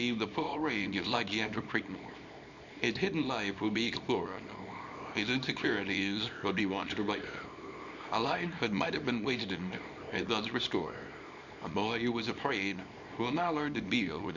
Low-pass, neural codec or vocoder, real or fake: 7.2 kHz; codec, 24 kHz, 0.9 kbps, WavTokenizer, small release; fake